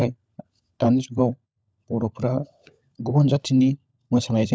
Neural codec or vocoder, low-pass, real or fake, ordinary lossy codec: codec, 16 kHz, 16 kbps, FunCodec, trained on LibriTTS, 50 frames a second; none; fake; none